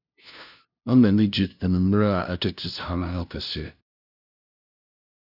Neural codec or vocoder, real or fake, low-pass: codec, 16 kHz, 0.5 kbps, FunCodec, trained on LibriTTS, 25 frames a second; fake; 5.4 kHz